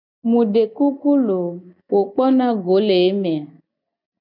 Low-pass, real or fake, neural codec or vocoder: 5.4 kHz; real; none